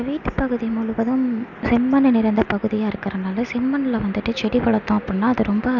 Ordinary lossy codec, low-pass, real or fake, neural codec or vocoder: none; 7.2 kHz; real; none